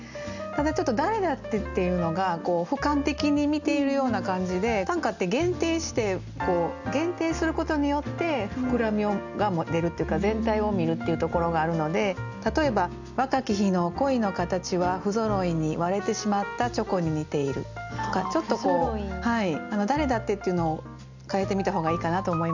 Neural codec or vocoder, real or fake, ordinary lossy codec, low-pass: none; real; none; 7.2 kHz